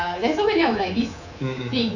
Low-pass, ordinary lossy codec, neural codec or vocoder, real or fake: 7.2 kHz; none; codec, 24 kHz, 3.1 kbps, DualCodec; fake